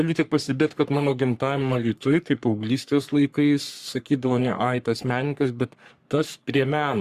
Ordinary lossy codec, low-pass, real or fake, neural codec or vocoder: Opus, 64 kbps; 14.4 kHz; fake; codec, 44.1 kHz, 3.4 kbps, Pupu-Codec